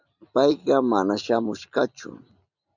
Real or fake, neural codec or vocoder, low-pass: real; none; 7.2 kHz